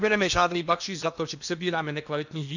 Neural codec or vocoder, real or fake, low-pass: codec, 16 kHz in and 24 kHz out, 0.8 kbps, FocalCodec, streaming, 65536 codes; fake; 7.2 kHz